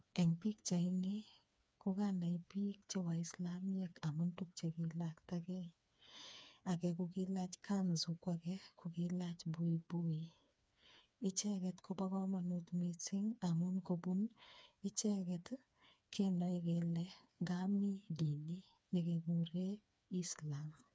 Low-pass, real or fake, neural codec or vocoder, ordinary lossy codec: none; fake; codec, 16 kHz, 4 kbps, FreqCodec, smaller model; none